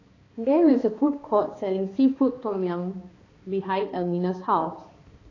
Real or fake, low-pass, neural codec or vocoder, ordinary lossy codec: fake; 7.2 kHz; codec, 16 kHz, 2 kbps, X-Codec, HuBERT features, trained on balanced general audio; AAC, 32 kbps